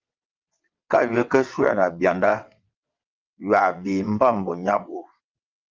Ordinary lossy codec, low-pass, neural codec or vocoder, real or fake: Opus, 32 kbps; 7.2 kHz; vocoder, 22.05 kHz, 80 mel bands, WaveNeXt; fake